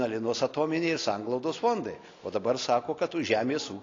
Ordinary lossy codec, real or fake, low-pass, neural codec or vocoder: AAC, 32 kbps; real; 7.2 kHz; none